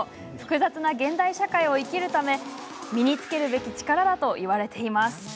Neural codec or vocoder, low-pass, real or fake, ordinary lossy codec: none; none; real; none